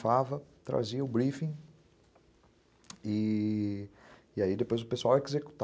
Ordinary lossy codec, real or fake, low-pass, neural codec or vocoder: none; real; none; none